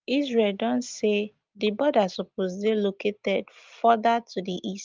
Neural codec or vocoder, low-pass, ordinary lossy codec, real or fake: none; 7.2 kHz; Opus, 32 kbps; real